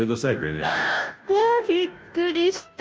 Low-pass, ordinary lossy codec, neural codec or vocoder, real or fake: none; none; codec, 16 kHz, 0.5 kbps, FunCodec, trained on Chinese and English, 25 frames a second; fake